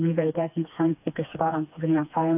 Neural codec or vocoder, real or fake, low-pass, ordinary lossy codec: codec, 16 kHz, 2 kbps, FreqCodec, smaller model; fake; 3.6 kHz; AAC, 32 kbps